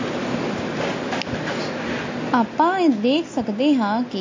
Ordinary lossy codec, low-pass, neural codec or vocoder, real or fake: MP3, 32 kbps; 7.2 kHz; none; real